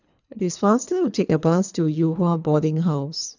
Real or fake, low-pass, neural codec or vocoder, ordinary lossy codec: fake; 7.2 kHz; codec, 24 kHz, 3 kbps, HILCodec; none